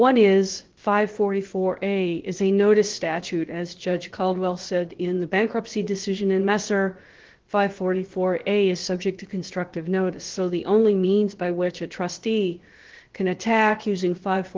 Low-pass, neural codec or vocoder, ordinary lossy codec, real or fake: 7.2 kHz; codec, 16 kHz, about 1 kbps, DyCAST, with the encoder's durations; Opus, 16 kbps; fake